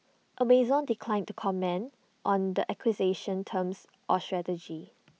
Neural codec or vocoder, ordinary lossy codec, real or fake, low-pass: none; none; real; none